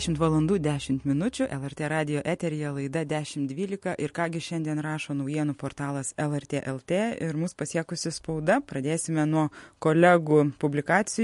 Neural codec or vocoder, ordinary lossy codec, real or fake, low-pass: none; MP3, 48 kbps; real; 14.4 kHz